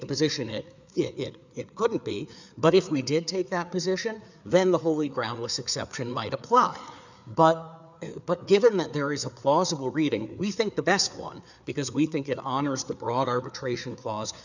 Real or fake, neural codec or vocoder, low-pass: fake; codec, 16 kHz, 4 kbps, FreqCodec, larger model; 7.2 kHz